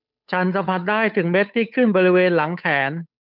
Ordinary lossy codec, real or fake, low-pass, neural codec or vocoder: none; fake; 5.4 kHz; codec, 16 kHz, 8 kbps, FunCodec, trained on Chinese and English, 25 frames a second